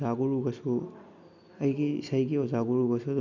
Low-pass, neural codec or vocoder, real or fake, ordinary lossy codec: 7.2 kHz; none; real; none